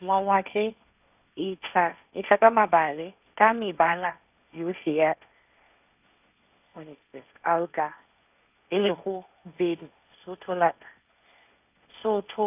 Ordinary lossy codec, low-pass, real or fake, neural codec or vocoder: none; 3.6 kHz; fake; codec, 16 kHz, 1.1 kbps, Voila-Tokenizer